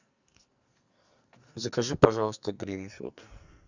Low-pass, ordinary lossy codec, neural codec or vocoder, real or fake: 7.2 kHz; Opus, 64 kbps; codec, 44.1 kHz, 2.6 kbps, SNAC; fake